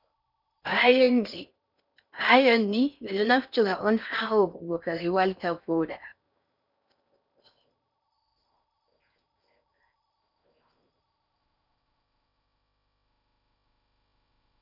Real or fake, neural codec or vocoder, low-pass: fake; codec, 16 kHz in and 24 kHz out, 0.6 kbps, FocalCodec, streaming, 4096 codes; 5.4 kHz